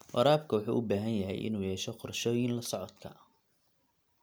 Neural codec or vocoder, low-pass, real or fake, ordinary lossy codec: none; none; real; none